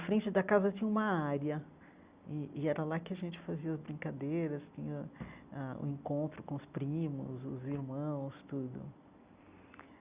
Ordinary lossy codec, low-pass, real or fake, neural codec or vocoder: Opus, 64 kbps; 3.6 kHz; real; none